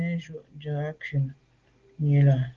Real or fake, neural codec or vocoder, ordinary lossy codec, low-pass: real; none; Opus, 16 kbps; 7.2 kHz